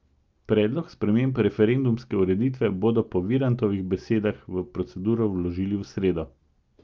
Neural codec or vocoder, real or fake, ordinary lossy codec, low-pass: none; real; Opus, 24 kbps; 7.2 kHz